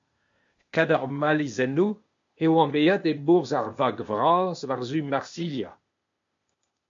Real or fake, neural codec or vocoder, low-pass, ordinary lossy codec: fake; codec, 16 kHz, 0.8 kbps, ZipCodec; 7.2 kHz; MP3, 48 kbps